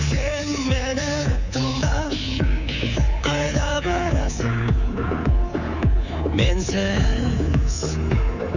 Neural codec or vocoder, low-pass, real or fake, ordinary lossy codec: codec, 24 kHz, 3.1 kbps, DualCodec; 7.2 kHz; fake; none